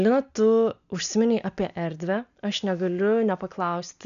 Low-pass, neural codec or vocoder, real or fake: 7.2 kHz; none; real